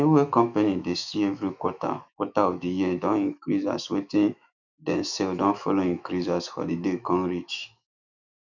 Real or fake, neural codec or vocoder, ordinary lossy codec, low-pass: fake; autoencoder, 48 kHz, 128 numbers a frame, DAC-VAE, trained on Japanese speech; none; 7.2 kHz